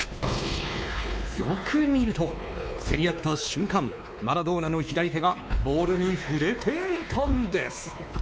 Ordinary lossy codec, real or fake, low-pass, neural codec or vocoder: none; fake; none; codec, 16 kHz, 2 kbps, X-Codec, WavLM features, trained on Multilingual LibriSpeech